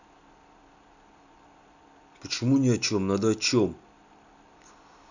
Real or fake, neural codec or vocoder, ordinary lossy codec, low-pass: real; none; none; 7.2 kHz